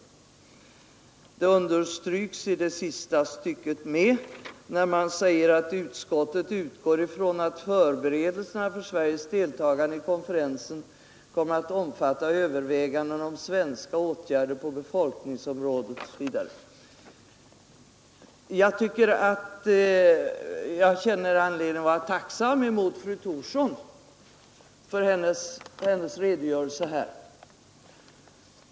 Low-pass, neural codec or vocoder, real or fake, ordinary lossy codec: none; none; real; none